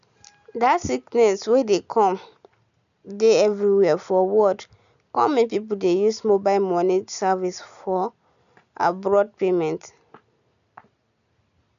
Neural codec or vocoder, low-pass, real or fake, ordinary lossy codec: none; 7.2 kHz; real; none